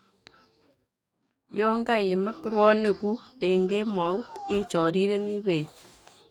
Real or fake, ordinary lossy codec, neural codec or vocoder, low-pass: fake; none; codec, 44.1 kHz, 2.6 kbps, DAC; 19.8 kHz